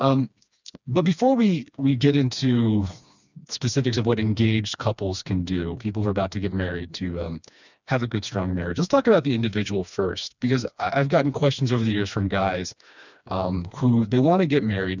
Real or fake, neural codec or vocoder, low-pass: fake; codec, 16 kHz, 2 kbps, FreqCodec, smaller model; 7.2 kHz